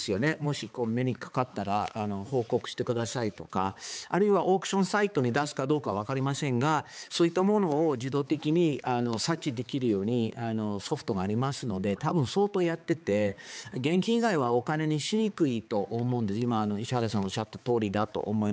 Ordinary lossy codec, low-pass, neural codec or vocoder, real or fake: none; none; codec, 16 kHz, 4 kbps, X-Codec, HuBERT features, trained on balanced general audio; fake